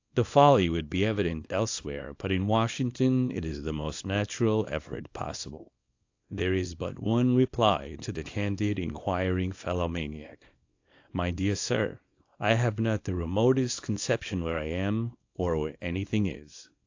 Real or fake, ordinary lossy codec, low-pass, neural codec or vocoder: fake; AAC, 48 kbps; 7.2 kHz; codec, 24 kHz, 0.9 kbps, WavTokenizer, small release